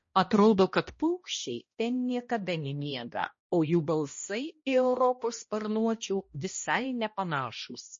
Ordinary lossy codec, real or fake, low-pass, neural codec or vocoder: MP3, 32 kbps; fake; 7.2 kHz; codec, 16 kHz, 1 kbps, X-Codec, HuBERT features, trained on balanced general audio